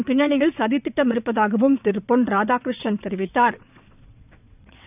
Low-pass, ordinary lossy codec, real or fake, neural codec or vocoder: 3.6 kHz; none; fake; vocoder, 22.05 kHz, 80 mel bands, WaveNeXt